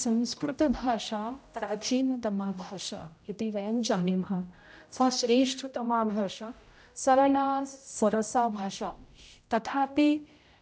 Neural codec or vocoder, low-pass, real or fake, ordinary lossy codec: codec, 16 kHz, 0.5 kbps, X-Codec, HuBERT features, trained on general audio; none; fake; none